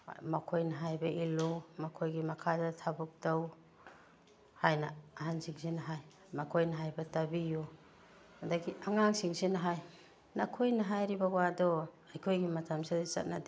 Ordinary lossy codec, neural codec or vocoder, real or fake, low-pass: none; none; real; none